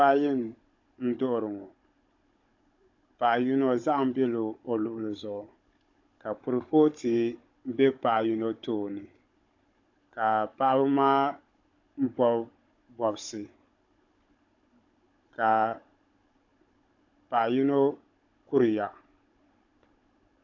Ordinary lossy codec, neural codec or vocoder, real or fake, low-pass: AAC, 48 kbps; codec, 16 kHz, 16 kbps, FunCodec, trained on Chinese and English, 50 frames a second; fake; 7.2 kHz